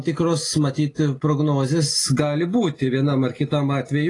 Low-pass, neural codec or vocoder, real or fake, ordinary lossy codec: 10.8 kHz; none; real; AAC, 32 kbps